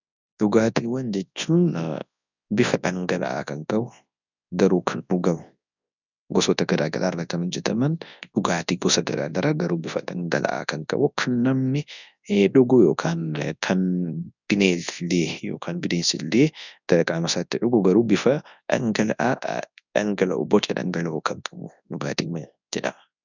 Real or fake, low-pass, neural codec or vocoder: fake; 7.2 kHz; codec, 24 kHz, 0.9 kbps, WavTokenizer, large speech release